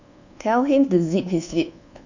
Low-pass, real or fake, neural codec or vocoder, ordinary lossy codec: 7.2 kHz; fake; codec, 24 kHz, 1.2 kbps, DualCodec; none